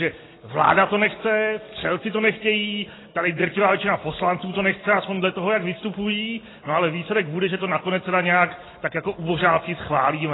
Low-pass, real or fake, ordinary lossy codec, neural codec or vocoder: 7.2 kHz; real; AAC, 16 kbps; none